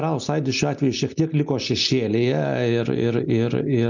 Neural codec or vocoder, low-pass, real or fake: none; 7.2 kHz; real